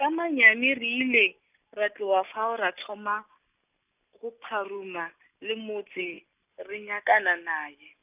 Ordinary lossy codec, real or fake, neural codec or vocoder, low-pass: none; real; none; 3.6 kHz